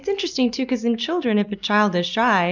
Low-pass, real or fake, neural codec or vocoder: 7.2 kHz; real; none